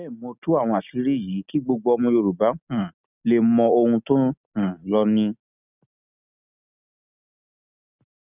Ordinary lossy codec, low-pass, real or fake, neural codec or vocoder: none; 3.6 kHz; real; none